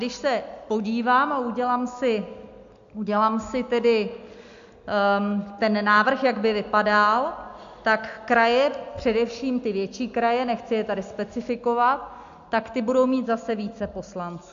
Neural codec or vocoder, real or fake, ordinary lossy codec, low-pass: none; real; AAC, 64 kbps; 7.2 kHz